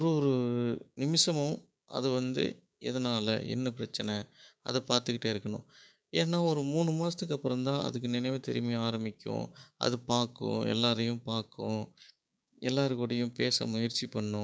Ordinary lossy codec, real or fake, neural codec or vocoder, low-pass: none; fake; codec, 16 kHz, 6 kbps, DAC; none